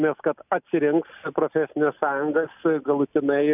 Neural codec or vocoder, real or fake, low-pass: none; real; 3.6 kHz